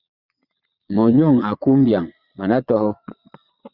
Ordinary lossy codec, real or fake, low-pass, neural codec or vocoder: Opus, 64 kbps; fake; 5.4 kHz; vocoder, 22.05 kHz, 80 mel bands, Vocos